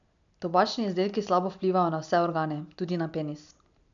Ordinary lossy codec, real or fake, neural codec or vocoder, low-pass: none; real; none; 7.2 kHz